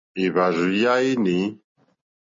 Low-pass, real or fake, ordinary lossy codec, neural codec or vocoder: 7.2 kHz; real; MP3, 32 kbps; none